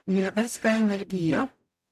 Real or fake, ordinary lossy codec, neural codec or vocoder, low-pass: fake; none; codec, 44.1 kHz, 0.9 kbps, DAC; 14.4 kHz